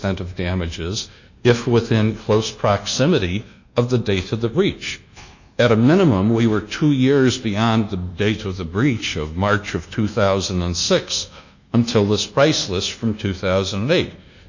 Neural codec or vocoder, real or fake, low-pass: codec, 24 kHz, 1.2 kbps, DualCodec; fake; 7.2 kHz